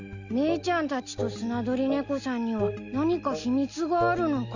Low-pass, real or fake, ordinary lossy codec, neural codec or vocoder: 7.2 kHz; real; Opus, 64 kbps; none